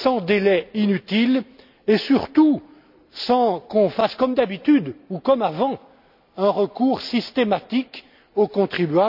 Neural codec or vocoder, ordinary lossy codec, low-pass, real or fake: none; none; 5.4 kHz; real